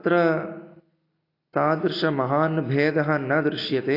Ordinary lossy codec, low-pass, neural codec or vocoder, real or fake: AAC, 32 kbps; 5.4 kHz; none; real